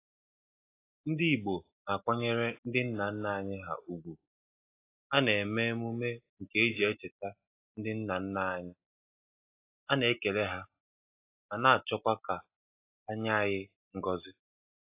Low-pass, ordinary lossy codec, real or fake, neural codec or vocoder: 3.6 kHz; AAC, 24 kbps; real; none